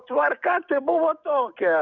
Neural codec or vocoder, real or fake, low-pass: codec, 44.1 kHz, 7.8 kbps, DAC; fake; 7.2 kHz